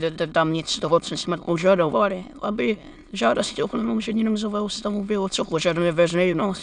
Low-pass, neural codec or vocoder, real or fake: 9.9 kHz; autoencoder, 22.05 kHz, a latent of 192 numbers a frame, VITS, trained on many speakers; fake